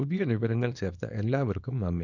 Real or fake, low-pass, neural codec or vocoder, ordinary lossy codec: fake; 7.2 kHz; codec, 24 kHz, 0.9 kbps, WavTokenizer, small release; none